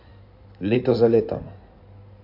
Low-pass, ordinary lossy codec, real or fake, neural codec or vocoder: 5.4 kHz; none; fake; codec, 16 kHz in and 24 kHz out, 2.2 kbps, FireRedTTS-2 codec